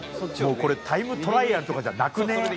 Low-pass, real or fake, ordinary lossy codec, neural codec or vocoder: none; real; none; none